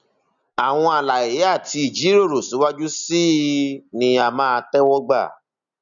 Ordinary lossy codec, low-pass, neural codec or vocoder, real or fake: none; 7.2 kHz; none; real